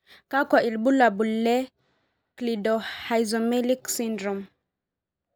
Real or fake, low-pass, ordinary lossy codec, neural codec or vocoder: real; none; none; none